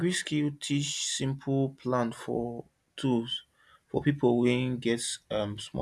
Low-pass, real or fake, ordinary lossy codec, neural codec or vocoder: none; real; none; none